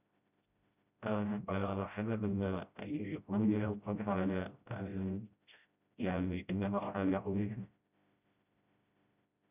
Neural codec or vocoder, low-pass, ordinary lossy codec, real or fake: codec, 16 kHz, 0.5 kbps, FreqCodec, smaller model; 3.6 kHz; none; fake